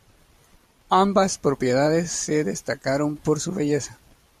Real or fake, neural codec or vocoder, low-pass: fake; vocoder, 44.1 kHz, 128 mel bands every 512 samples, BigVGAN v2; 14.4 kHz